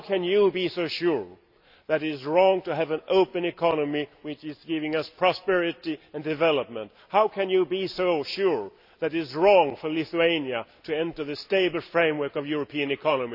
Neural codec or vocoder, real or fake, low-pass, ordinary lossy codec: none; real; 5.4 kHz; none